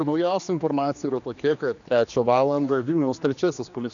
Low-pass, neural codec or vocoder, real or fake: 7.2 kHz; codec, 16 kHz, 2 kbps, X-Codec, HuBERT features, trained on general audio; fake